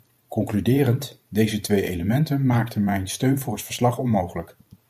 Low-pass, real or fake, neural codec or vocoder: 14.4 kHz; fake; vocoder, 44.1 kHz, 128 mel bands every 512 samples, BigVGAN v2